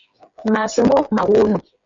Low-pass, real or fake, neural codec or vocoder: 7.2 kHz; fake; codec, 16 kHz, 8 kbps, FreqCodec, smaller model